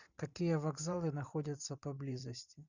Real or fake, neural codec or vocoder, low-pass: fake; vocoder, 44.1 kHz, 128 mel bands every 512 samples, BigVGAN v2; 7.2 kHz